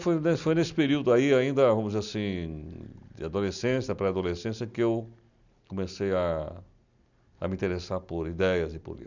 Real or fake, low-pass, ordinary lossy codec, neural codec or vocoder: real; 7.2 kHz; none; none